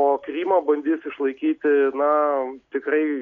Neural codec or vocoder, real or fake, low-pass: none; real; 7.2 kHz